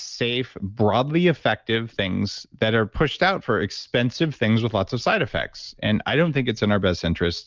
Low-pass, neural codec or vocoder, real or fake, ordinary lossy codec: 7.2 kHz; none; real; Opus, 24 kbps